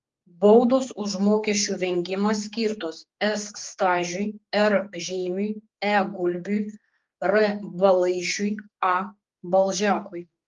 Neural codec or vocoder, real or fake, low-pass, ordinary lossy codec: codec, 16 kHz, 4 kbps, X-Codec, HuBERT features, trained on general audio; fake; 7.2 kHz; Opus, 32 kbps